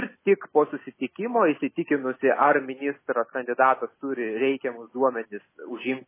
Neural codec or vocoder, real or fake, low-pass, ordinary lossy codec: none; real; 3.6 kHz; MP3, 16 kbps